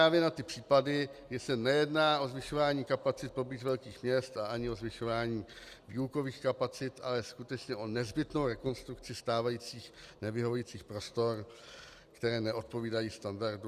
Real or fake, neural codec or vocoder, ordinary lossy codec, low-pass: real; none; Opus, 64 kbps; 14.4 kHz